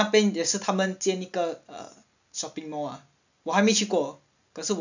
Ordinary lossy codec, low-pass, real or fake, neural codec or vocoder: none; 7.2 kHz; real; none